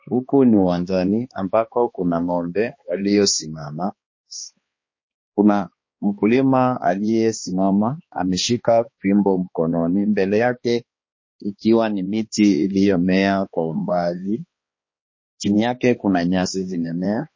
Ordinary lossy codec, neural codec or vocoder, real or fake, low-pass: MP3, 32 kbps; codec, 16 kHz, 2 kbps, X-Codec, HuBERT features, trained on balanced general audio; fake; 7.2 kHz